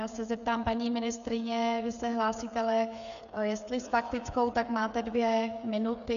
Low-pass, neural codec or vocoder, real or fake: 7.2 kHz; codec, 16 kHz, 8 kbps, FreqCodec, smaller model; fake